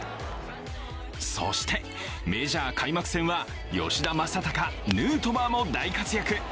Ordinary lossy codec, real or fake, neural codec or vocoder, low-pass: none; real; none; none